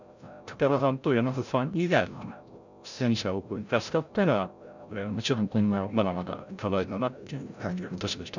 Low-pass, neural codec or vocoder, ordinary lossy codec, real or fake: 7.2 kHz; codec, 16 kHz, 0.5 kbps, FreqCodec, larger model; none; fake